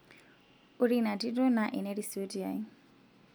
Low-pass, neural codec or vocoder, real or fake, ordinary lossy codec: none; none; real; none